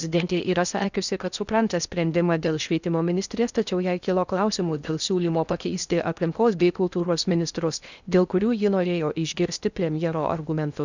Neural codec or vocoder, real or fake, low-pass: codec, 16 kHz in and 24 kHz out, 0.6 kbps, FocalCodec, streaming, 4096 codes; fake; 7.2 kHz